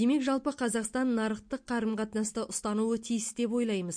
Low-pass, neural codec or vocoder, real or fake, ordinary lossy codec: 9.9 kHz; none; real; MP3, 48 kbps